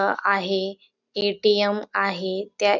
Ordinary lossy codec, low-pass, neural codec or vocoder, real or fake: none; 7.2 kHz; none; real